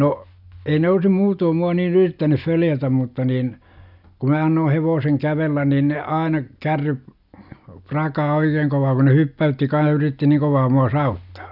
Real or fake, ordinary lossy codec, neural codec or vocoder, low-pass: real; none; none; 5.4 kHz